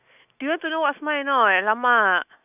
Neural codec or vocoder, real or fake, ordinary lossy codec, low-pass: autoencoder, 48 kHz, 128 numbers a frame, DAC-VAE, trained on Japanese speech; fake; none; 3.6 kHz